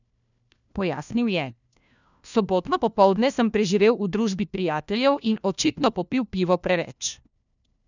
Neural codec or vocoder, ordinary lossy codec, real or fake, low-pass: codec, 16 kHz, 1 kbps, FunCodec, trained on LibriTTS, 50 frames a second; none; fake; 7.2 kHz